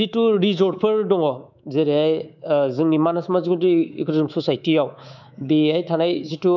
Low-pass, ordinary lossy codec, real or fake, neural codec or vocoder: 7.2 kHz; none; fake; codec, 24 kHz, 3.1 kbps, DualCodec